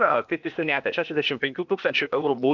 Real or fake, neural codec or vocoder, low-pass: fake; codec, 16 kHz, 0.8 kbps, ZipCodec; 7.2 kHz